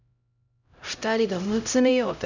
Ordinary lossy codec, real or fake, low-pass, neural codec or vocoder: none; fake; 7.2 kHz; codec, 16 kHz, 0.5 kbps, X-Codec, HuBERT features, trained on LibriSpeech